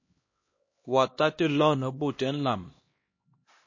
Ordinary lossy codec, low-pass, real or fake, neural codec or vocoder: MP3, 32 kbps; 7.2 kHz; fake; codec, 16 kHz, 1 kbps, X-Codec, HuBERT features, trained on LibriSpeech